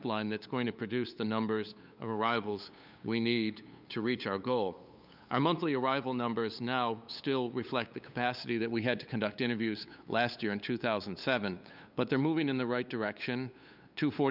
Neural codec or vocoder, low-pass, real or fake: codec, 16 kHz, 8 kbps, FunCodec, trained on LibriTTS, 25 frames a second; 5.4 kHz; fake